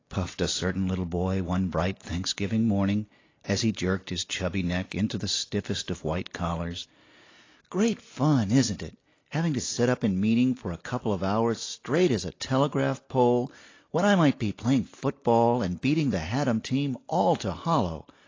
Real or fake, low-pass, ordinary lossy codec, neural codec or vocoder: real; 7.2 kHz; AAC, 32 kbps; none